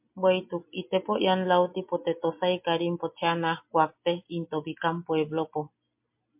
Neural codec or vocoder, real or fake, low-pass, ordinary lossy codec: none; real; 3.6 kHz; MP3, 32 kbps